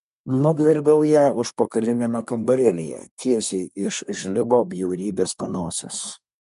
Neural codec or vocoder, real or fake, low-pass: codec, 24 kHz, 1 kbps, SNAC; fake; 10.8 kHz